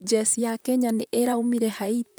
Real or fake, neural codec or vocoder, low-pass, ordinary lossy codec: fake; vocoder, 44.1 kHz, 128 mel bands, Pupu-Vocoder; none; none